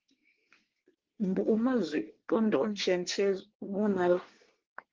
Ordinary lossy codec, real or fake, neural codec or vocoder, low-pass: Opus, 16 kbps; fake; codec, 24 kHz, 1 kbps, SNAC; 7.2 kHz